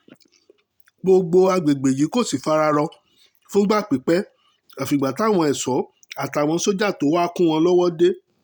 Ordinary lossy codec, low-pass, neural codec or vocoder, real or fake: none; none; none; real